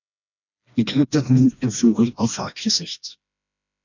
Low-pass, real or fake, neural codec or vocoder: 7.2 kHz; fake; codec, 16 kHz, 1 kbps, FreqCodec, smaller model